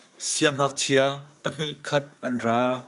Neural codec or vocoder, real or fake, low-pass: codec, 24 kHz, 1 kbps, SNAC; fake; 10.8 kHz